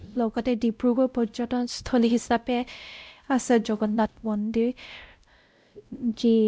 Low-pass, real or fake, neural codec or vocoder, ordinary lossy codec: none; fake; codec, 16 kHz, 0.5 kbps, X-Codec, WavLM features, trained on Multilingual LibriSpeech; none